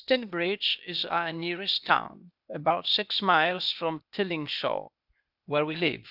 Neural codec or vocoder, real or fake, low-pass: codec, 16 kHz, 0.8 kbps, ZipCodec; fake; 5.4 kHz